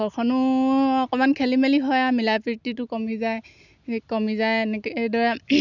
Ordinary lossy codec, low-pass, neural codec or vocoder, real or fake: none; 7.2 kHz; none; real